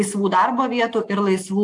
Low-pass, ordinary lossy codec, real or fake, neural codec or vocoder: 10.8 kHz; AAC, 64 kbps; real; none